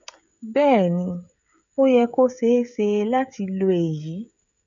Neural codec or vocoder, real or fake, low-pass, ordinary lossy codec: codec, 16 kHz, 16 kbps, FreqCodec, smaller model; fake; 7.2 kHz; none